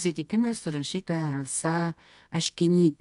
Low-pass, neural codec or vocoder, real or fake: 10.8 kHz; codec, 24 kHz, 0.9 kbps, WavTokenizer, medium music audio release; fake